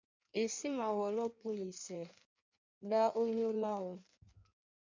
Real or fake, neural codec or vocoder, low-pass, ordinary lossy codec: fake; codec, 16 kHz in and 24 kHz out, 1.1 kbps, FireRedTTS-2 codec; 7.2 kHz; MP3, 64 kbps